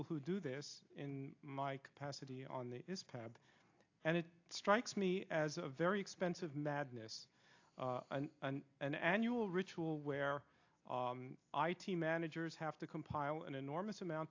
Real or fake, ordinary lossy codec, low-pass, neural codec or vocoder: real; AAC, 48 kbps; 7.2 kHz; none